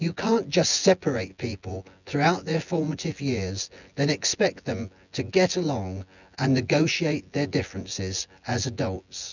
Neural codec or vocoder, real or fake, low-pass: vocoder, 24 kHz, 100 mel bands, Vocos; fake; 7.2 kHz